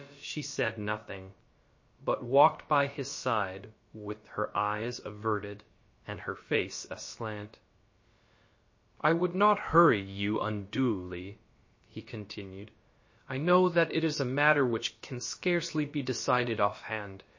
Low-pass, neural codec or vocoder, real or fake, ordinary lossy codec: 7.2 kHz; codec, 16 kHz, about 1 kbps, DyCAST, with the encoder's durations; fake; MP3, 32 kbps